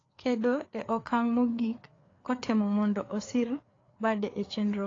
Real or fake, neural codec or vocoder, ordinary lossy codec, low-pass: fake; codec, 16 kHz, 4 kbps, FreqCodec, larger model; AAC, 32 kbps; 7.2 kHz